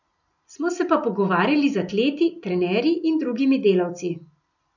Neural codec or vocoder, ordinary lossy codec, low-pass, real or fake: none; none; 7.2 kHz; real